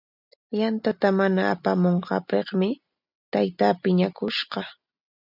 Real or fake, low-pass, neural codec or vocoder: real; 5.4 kHz; none